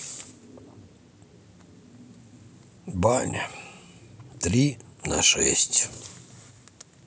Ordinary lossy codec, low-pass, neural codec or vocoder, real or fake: none; none; none; real